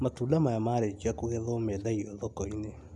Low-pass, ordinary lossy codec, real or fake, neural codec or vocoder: none; none; real; none